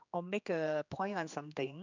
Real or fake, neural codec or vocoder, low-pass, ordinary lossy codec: fake; codec, 16 kHz, 2 kbps, X-Codec, HuBERT features, trained on general audio; 7.2 kHz; none